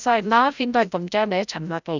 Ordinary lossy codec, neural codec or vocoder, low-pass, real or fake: none; codec, 16 kHz, 0.5 kbps, FreqCodec, larger model; 7.2 kHz; fake